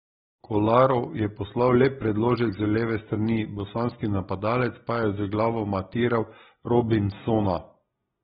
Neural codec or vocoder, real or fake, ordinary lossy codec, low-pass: none; real; AAC, 16 kbps; 7.2 kHz